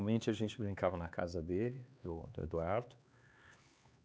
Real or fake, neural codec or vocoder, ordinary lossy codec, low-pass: fake; codec, 16 kHz, 4 kbps, X-Codec, HuBERT features, trained on LibriSpeech; none; none